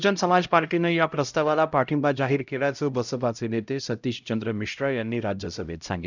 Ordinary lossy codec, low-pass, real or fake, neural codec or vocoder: none; 7.2 kHz; fake; codec, 16 kHz, 0.5 kbps, X-Codec, HuBERT features, trained on LibriSpeech